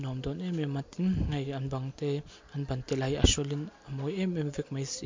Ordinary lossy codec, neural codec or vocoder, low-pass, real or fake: MP3, 48 kbps; none; 7.2 kHz; real